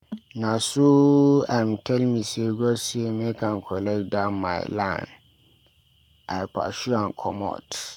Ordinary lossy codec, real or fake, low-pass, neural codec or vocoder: none; fake; 19.8 kHz; codec, 44.1 kHz, 7.8 kbps, Pupu-Codec